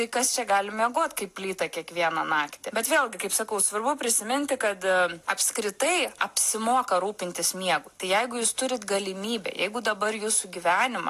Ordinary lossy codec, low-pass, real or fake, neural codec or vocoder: AAC, 64 kbps; 14.4 kHz; real; none